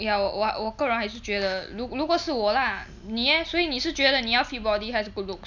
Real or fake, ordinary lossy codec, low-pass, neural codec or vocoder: real; none; 7.2 kHz; none